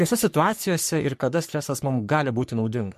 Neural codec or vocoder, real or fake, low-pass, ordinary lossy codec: codec, 44.1 kHz, 3.4 kbps, Pupu-Codec; fake; 14.4 kHz; MP3, 64 kbps